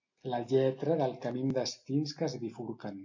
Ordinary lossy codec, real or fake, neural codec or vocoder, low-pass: AAC, 48 kbps; real; none; 7.2 kHz